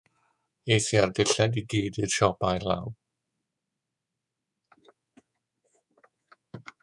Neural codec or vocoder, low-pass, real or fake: codec, 24 kHz, 3.1 kbps, DualCodec; 10.8 kHz; fake